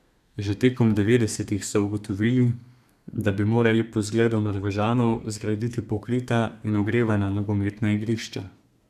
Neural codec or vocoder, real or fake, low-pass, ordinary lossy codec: codec, 32 kHz, 1.9 kbps, SNAC; fake; 14.4 kHz; none